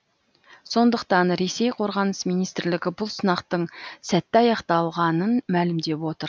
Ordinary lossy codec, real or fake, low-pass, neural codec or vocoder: none; real; none; none